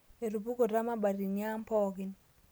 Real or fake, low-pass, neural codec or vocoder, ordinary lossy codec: real; none; none; none